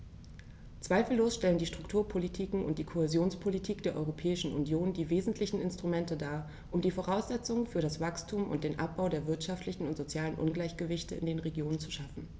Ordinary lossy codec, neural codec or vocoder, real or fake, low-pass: none; none; real; none